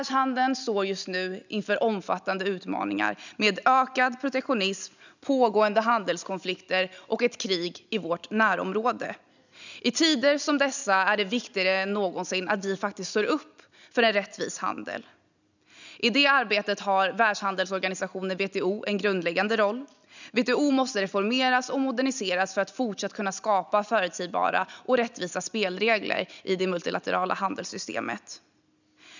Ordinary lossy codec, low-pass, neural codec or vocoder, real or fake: none; 7.2 kHz; none; real